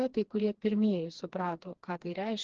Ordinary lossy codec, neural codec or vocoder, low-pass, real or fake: Opus, 16 kbps; codec, 16 kHz, 2 kbps, FreqCodec, smaller model; 7.2 kHz; fake